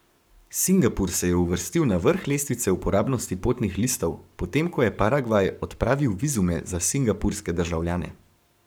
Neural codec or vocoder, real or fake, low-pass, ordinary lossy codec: codec, 44.1 kHz, 7.8 kbps, Pupu-Codec; fake; none; none